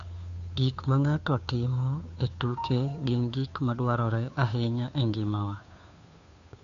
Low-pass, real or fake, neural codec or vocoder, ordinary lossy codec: 7.2 kHz; fake; codec, 16 kHz, 2 kbps, FunCodec, trained on Chinese and English, 25 frames a second; none